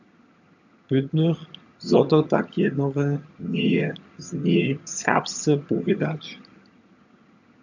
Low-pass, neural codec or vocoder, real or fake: 7.2 kHz; vocoder, 22.05 kHz, 80 mel bands, HiFi-GAN; fake